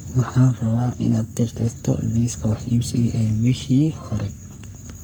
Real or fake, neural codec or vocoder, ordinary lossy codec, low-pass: fake; codec, 44.1 kHz, 3.4 kbps, Pupu-Codec; none; none